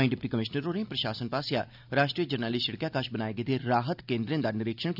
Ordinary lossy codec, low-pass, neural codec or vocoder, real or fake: none; 5.4 kHz; none; real